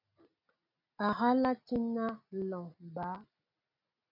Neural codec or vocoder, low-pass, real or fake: none; 5.4 kHz; real